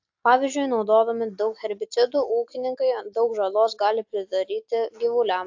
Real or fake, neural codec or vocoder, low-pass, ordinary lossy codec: real; none; 7.2 kHz; AAC, 48 kbps